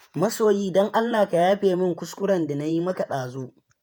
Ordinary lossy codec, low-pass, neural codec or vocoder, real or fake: none; none; vocoder, 48 kHz, 128 mel bands, Vocos; fake